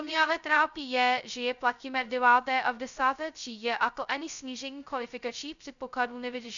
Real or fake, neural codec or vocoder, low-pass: fake; codec, 16 kHz, 0.2 kbps, FocalCodec; 7.2 kHz